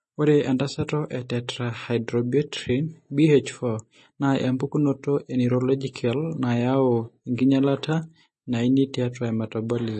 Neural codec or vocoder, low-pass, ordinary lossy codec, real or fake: none; 10.8 kHz; MP3, 32 kbps; real